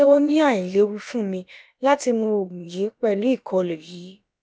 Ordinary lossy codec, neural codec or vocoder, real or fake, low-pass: none; codec, 16 kHz, about 1 kbps, DyCAST, with the encoder's durations; fake; none